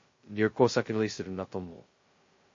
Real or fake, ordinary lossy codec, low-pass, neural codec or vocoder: fake; MP3, 32 kbps; 7.2 kHz; codec, 16 kHz, 0.2 kbps, FocalCodec